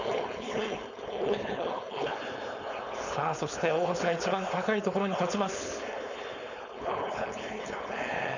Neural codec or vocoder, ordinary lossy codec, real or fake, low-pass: codec, 16 kHz, 4.8 kbps, FACodec; none; fake; 7.2 kHz